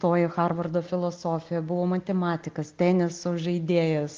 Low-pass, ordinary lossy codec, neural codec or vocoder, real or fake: 7.2 kHz; Opus, 24 kbps; none; real